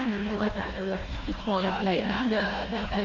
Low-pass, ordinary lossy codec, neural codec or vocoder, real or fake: 7.2 kHz; Opus, 64 kbps; codec, 16 kHz, 1 kbps, FunCodec, trained on Chinese and English, 50 frames a second; fake